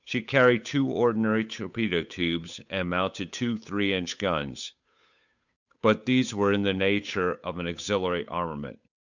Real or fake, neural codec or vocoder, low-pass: fake; codec, 16 kHz, 8 kbps, FunCodec, trained on Chinese and English, 25 frames a second; 7.2 kHz